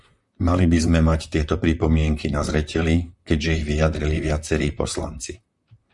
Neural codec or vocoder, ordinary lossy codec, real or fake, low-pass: vocoder, 44.1 kHz, 128 mel bands, Pupu-Vocoder; Opus, 64 kbps; fake; 10.8 kHz